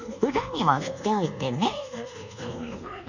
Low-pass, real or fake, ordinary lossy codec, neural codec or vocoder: 7.2 kHz; fake; none; codec, 24 kHz, 1.2 kbps, DualCodec